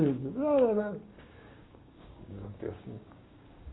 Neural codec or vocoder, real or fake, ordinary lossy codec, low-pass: codec, 16 kHz, 6 kbps, DAC; fake; AAC, 16 kbps; 7.2 kHz